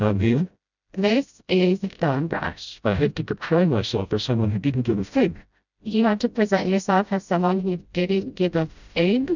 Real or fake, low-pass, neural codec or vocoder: fake; 7.2 kHz; codec, 16 kHz, 0.5 kbps, FreqCodec, smaller model